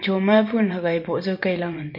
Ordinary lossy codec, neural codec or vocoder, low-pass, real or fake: MP3, 32 kbps; vocoder, 44.1 kHz, 128 mel bands every 512 samples, BigVGAN v2; 5.4 kHz; fake